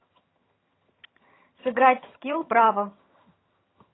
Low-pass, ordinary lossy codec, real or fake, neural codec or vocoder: 7.2 kHz; AAC, 16 kbps; fake; vocoder, 22.05 kHz, 80 mel bands, HiFi-GAN